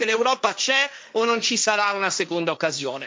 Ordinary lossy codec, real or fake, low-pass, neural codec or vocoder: none; fake; none; codec, 16 kHz, 1.1 kbps, Voila-Tokenizer